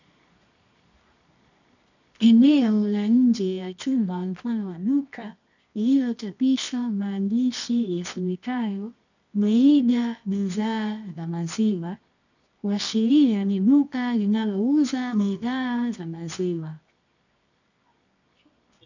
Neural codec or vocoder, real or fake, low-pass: codec, 24 kHz, 0.9 kbps, WavTokenizer, medium music audio release; fake; 7.2 kHz